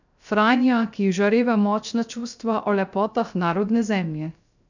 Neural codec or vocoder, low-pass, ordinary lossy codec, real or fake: codec, 16 kHz, 0.3 kbps, FocalCodec; 7.2 kHz; none; fake